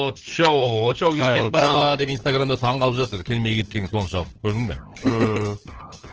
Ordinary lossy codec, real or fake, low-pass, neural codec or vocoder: Opus, 16 kbps; fake; 7.2 kHz; codec, 16 kHz, 4 kbps, FunCodec, trained on LibriTTS, 50 frames a second